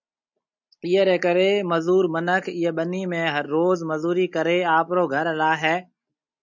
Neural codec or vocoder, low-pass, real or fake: none; 7.2 kHz; real